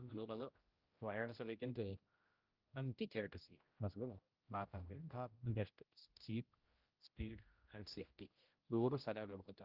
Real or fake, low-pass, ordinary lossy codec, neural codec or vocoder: fake; 5.4 kHz; Opus, 32 kbps; codec, 16 kHz, 0.5 kbps, X-Codec, HuBERT features, trained on general audio